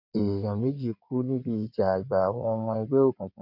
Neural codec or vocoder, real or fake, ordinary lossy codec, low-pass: codec, 16 kHz in and 24 kHz out, 2.2 kbps, FireRedTTS-2 codec; fake; none; 5.4 kHz